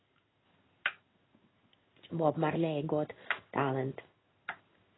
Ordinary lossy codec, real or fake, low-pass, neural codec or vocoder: AAC, 16 kbps; fake; 7.2 kHz; codec, 16 kHz in and 24 kHz out, 1 kbps, XY-Tokenizer